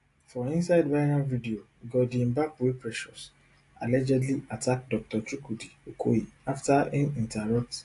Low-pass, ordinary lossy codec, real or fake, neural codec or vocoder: 10.8 kHz; AAC, 48 kbps; real; none